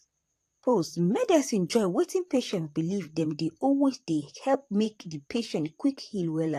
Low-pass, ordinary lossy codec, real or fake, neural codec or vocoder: 14.4 kHz; AAC, 64 kbps; fake; vocoder, 44.1 kHz, 128 mel bands, Pupu-Vocoder